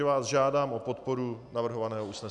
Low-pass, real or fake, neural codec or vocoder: 10.8 kHz; real; none